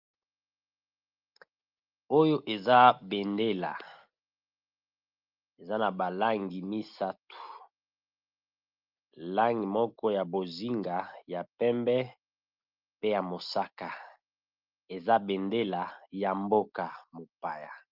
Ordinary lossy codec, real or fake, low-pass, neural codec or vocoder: Opus, 32 kbps; real; 5.4 kHz; none